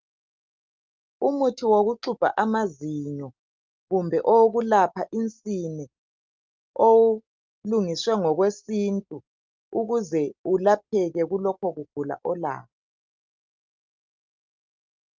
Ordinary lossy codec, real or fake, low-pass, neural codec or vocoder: Opus, 24 kbps; real; 7.2 kHz; none